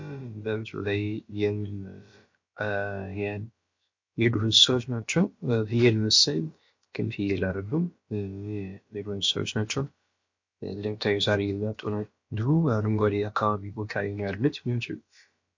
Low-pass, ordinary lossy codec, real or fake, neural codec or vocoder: 7.2 kHz; MP3, 48 kbps; fake; codec, 16 kHz, about 1 kbps, DyCAST, with the encoder's durations